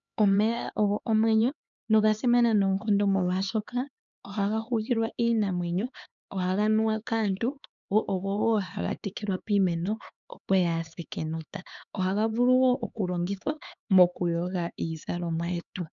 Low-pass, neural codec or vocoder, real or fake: 7.2 kHz; codec, 16 kHz, 4 kbps, X-Codec, HuBERT features, trained on LibriSpeech; fake